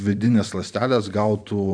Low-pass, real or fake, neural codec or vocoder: 9.9 kHz; fake; vocoder, 44.1 kHz, 128 mel bands every 512 samples, BigVGAN v2